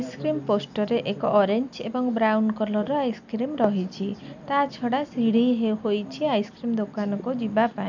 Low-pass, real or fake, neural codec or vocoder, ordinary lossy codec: 7.2 kHz; real; none; none